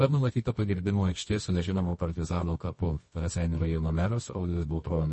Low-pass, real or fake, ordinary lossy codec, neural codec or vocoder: 10.8 kHz; fake; MP3, 32 kbps; codec, 24 kHz, 0.9 kbps, WavTokenizer, medium music audio release